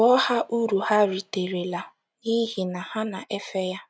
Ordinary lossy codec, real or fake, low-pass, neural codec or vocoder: none; real; none; none